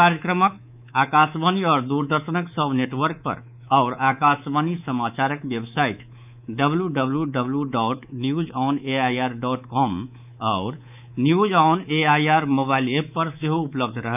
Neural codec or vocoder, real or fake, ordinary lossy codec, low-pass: codec, 24 kHz, 3.1 kbps, DualCodec; fake; none; 3.6 kHz